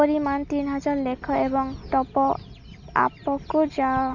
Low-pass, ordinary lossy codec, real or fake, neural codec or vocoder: 7.2 kHz; none; real; none